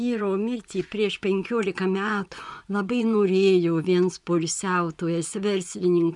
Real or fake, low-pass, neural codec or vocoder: fake; 10.8 kHz; vocoder, 24 kHz, 100 mel bands, Vocos